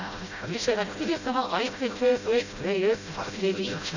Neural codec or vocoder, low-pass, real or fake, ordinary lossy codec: codec, 16 kHz, 0.5 kbps, FreqCodec, smaller model; 7.2 kHz; fake; none